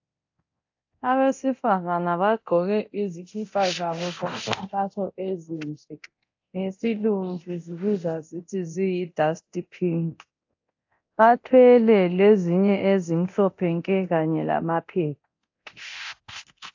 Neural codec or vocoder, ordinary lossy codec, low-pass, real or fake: codec, 24 kHz, 0.9 kbps, DualCodec; AAC, 48 kbps; 7.2 kHz; fake